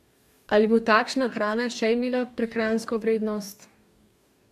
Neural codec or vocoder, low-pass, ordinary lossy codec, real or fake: codec, 44.1 kHz, 2.6 kbps, DAC; 14.4 kHz; MP3, 96 kbps; fake